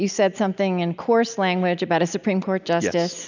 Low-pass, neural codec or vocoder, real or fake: 7.2 kHz; none; real